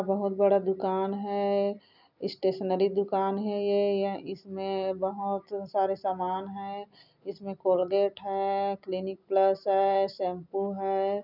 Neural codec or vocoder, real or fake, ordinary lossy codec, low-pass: none; real; none; 5.4 kHz